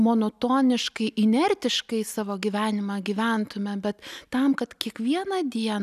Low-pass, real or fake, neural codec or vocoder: 14.4 kHz; real; none